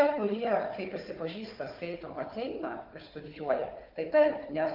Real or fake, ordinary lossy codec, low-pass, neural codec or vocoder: fake; Opus, 24 kbps; 5.4 kHz; codec, 16 kHz, 4 kbps, FunCodec, trained on Chinese and English, 50 frames a second